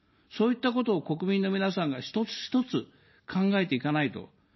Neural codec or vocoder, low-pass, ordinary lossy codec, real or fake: none; 7.2 kHz; MP3, 24 kbps; real